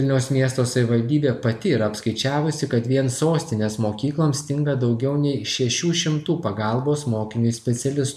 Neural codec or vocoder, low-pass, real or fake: none; 14.4 kHz; real